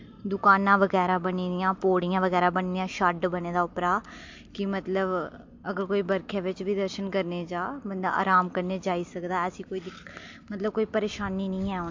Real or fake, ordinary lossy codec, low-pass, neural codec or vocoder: real; MP3, 48 kbps; 7.2 kHz; none